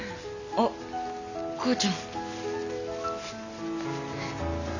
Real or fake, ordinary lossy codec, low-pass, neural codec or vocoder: real; none; 7.2 kHz; none